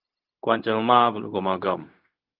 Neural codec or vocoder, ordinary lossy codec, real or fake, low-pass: codec, 16 kHz, 0.4 kbps, LongCat-Audio-Codec; Opus, 24 kbps; fake; 5.4 kHz